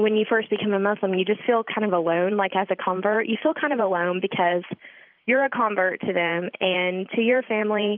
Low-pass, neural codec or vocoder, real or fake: 5.4 kHz; vocoder, 44.1 kHz, 128 mel bands every 512 samples, BigVGAN v2; fake